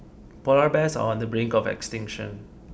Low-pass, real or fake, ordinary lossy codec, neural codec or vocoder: none; real; none; none